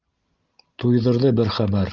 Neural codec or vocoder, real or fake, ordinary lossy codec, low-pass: none; real; Opus, 32 kbps; 7.2 kHz